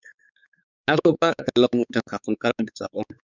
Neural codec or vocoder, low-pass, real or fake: codec, 16 kHz, 4 kbps, X-Codec, WavLM features, trained on Multilingual LibriSpeech; 7.2 kHz; fake